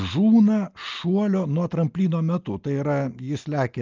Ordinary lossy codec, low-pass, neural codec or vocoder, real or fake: Opus, 32 kbps; 7.2 kHz; none; real